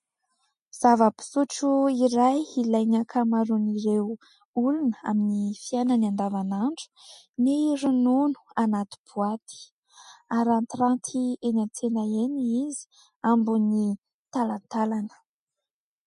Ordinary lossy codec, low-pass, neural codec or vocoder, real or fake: MP3, 48 kbps; 14.4 kHz; none; real